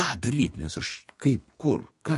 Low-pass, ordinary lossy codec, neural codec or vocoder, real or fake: 14.4 kHz; MP3, 48 kbps; codec, 32 kHz, 1.9 kbps, SNAC; fake